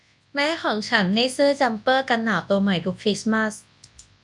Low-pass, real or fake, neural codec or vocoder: 10.8 kHz; fake; codec, 24 kHz, 0.9 kbps, WavTokenizer, large speech release